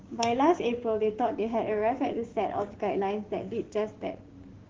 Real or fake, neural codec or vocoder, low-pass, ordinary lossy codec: fake; codec, 16 kHz, 6 kbps, DAC; 7.2 kHz; Opus, 16 kbps